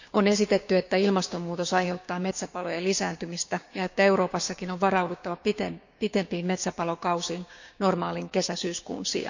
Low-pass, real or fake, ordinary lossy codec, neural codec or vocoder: 7.2 kHz; fake; none; codec, 16 kHz, 6 kbps, DAC